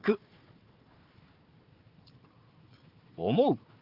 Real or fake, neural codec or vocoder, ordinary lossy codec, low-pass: fake; codec, 16 kHz, 4 kbps, FunCodec, trained on Chinese and English, 50 frames a second; Opus, 24 kbps; 5.4 kHz